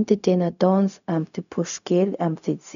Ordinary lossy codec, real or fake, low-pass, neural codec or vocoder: none; fake; 7.2 kHz; codec, 16 kHz, 0.4 kbps, LongCat-Audio-Codec